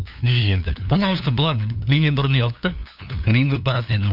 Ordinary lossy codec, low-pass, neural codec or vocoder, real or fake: none; 5.4 kHz; codec, 16 kHz, 2 kbps, FunCodec, trained on LibriTTS, 25 frames a second; fake